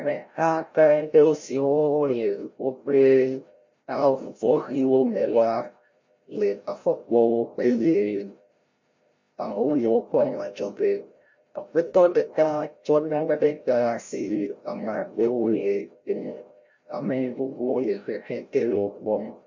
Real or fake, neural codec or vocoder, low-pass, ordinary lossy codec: fake; codec, 16 kHz, 0.5 kbps, FreqCodec, larger model; 7.2 kHz; MP3, 48 kbps